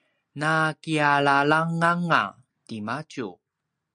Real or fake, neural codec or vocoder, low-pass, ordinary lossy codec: real; none; 9.9 kHz; MP3, 64 kbps